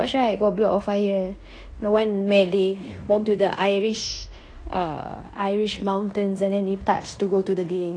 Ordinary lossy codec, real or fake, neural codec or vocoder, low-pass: AAC, 48 kbps; fake; codec, 16 kHz in and 24 kHz out, 0.9 kbps, LongCat-Audio-Codec, fine tuned four codebook decoder; 9.9 kHz